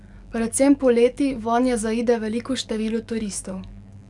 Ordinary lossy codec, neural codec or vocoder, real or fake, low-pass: none; codec, 44.1 kHz, 7.8 kbps, DAC; fake; 10.8 kHz